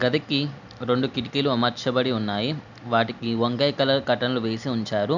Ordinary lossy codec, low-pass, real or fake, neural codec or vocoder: none; 7.2 kHz; real; none